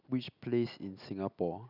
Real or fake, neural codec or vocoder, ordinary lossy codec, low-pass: real; none; none; 5.4 kHz